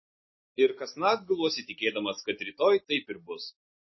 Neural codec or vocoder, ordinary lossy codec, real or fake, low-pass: none; MP3, 24 kbps; real; 7.2 kHz